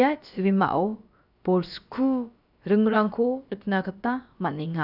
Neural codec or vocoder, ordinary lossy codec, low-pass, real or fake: codec, 16 kHz, about 1 kbps, DyCAST, with the encoder's durations; none; 5.4 kHz; fake